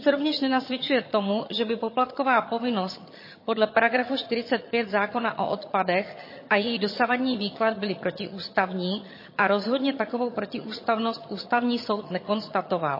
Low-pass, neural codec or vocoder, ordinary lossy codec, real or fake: 5.4 kHz; vocoder, 22.05 kHz, 80 mel bands, HiFi-GAN; MP3, 24 kbps; fake